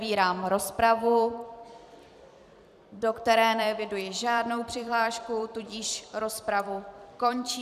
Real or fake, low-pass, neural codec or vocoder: fake; 14.4 kHz; vocoder, 44.1 kHz, 128 mel bands every 256 samples, BigVGAN v2